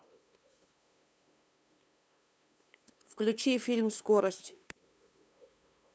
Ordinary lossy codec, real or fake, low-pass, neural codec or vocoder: none; fake; none; codec, 16 kHz, 2 kbps, FunCodec, trained on LibriTTS, 25 frames a second